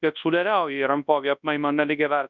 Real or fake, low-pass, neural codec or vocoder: fake; 7.2 kHz; codec, 24 kHz, 0.9 kbps, WavTokenizer, large speech release